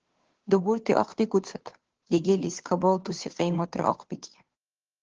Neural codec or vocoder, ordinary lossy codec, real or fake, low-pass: codec, 16 kHz, 2 kbps, FunCodec, trained on Chinese and English, 25 frames a second; Opus, 16 kbps; fake; 7.2 kHz